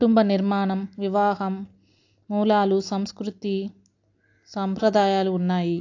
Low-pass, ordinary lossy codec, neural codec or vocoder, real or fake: 7.2 kHz; AAC, 48 kbps; none; real